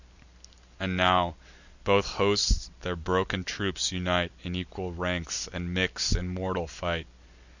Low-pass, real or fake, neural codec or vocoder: 7.2 kHz; real; none